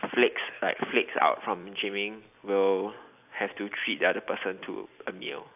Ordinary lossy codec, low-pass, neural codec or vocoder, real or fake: AAC, 32 kbps; 3.6 kHz; none; real